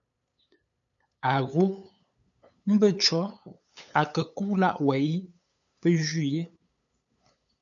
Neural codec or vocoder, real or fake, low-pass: codec, 16 kHz, 8 kbps, FunCodec, trained on LibriTTS, 25 frames a second; fake; 7.2 kHz